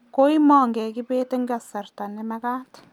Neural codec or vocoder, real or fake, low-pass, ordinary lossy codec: none; real; 19.8 kHz; none